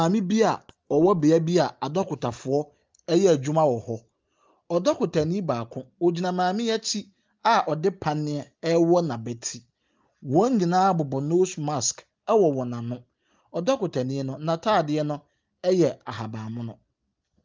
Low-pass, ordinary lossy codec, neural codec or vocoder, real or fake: 7.2 kHz; Opus, 24 kbps; none; real